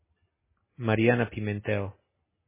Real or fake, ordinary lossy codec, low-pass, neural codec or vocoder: real; MP3, 16 kbps; 3.6 kHz; none